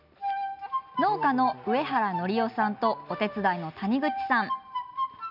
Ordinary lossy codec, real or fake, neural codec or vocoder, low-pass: none; real; none; 5.4 kHz